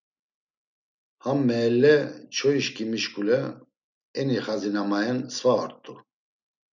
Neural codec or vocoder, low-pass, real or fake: none; 7.2 kHz; real